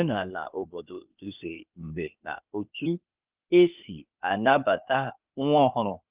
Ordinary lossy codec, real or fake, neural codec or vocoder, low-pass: Opus, 24 kbps; fake; codec, 16 kHz, 0.8 kbps, ZipCodec; 3.6 kHz